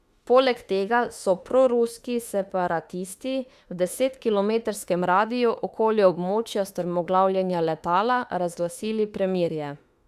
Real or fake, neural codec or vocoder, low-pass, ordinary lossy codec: fake; autoencoder, 48 kHz, 32 numbers a frame, DAC-VAE, trained on Japanese speech; 14.4 kHz; Opus, 64 kbps